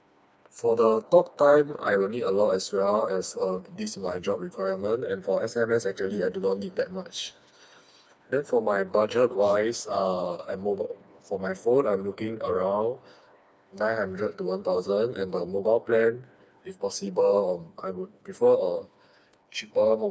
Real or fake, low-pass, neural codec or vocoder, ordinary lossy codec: fake; none; codec, 16 kHz, 2 kbps, FreqCodec, smaller model; none